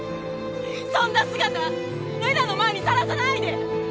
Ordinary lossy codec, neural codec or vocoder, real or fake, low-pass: none; none; real; none